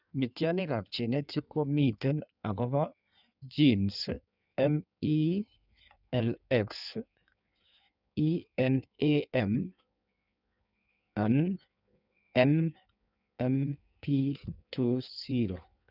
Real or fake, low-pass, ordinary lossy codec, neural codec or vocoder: fake; 5.4 kHz; none; codec, 16 kHz in and 24 kHz out, 1.1 kbps, FireRedTTS-2 codec